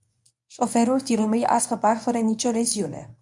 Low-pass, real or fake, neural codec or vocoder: 10.8 kHz; fake; codec, 24 kHz, 0.9 kbps, WavTokenizer, medium speech release version 1